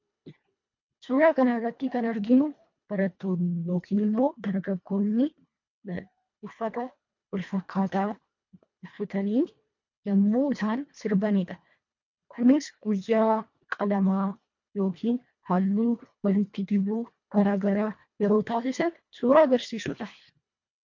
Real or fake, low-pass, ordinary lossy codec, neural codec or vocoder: fake; 7.2 kHz; MP3, 48 kbps; codec, 24 kHz, 1.5 kbps, HILCodec